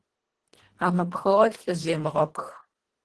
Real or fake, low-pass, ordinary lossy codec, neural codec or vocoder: fake; 10.8 kHz; Opus, 16 kbps; codec, 24 kHz, 1.5 kbps, HILCodec